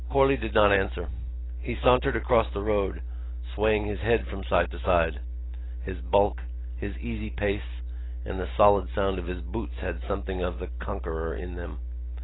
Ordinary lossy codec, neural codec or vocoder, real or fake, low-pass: AAC, 16 kbps; none; real; 7.2 kHz